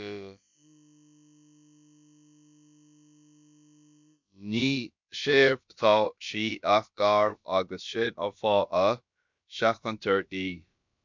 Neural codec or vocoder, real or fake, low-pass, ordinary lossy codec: codec, 16 kHz, about 1 kbps, DyCAST, with the encoder's durations; fake; 7.2 kHz; MP3, 64 kbps